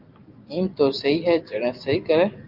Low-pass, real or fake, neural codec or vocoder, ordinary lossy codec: 5.4 kHz; real; none; Opus, 32 kbps